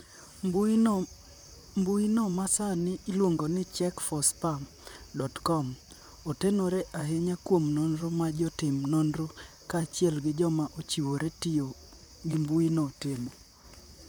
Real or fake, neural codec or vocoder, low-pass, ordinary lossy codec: fake; vocoder, 44.1 kHz, 128 mel bands, Pupu-Vocoder; none; none